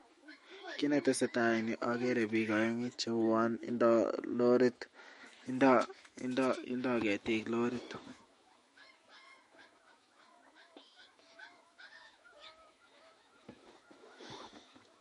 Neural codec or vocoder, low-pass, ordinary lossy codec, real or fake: autoencoder, 48 kHz, 128 numbers a frame, DAC-VAE, trained on Japanese speech; 19.8 kHz; MP3, 48 kbps; fake